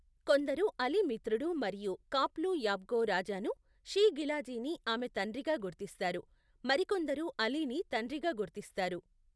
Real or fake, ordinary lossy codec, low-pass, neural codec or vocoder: real; none; none; none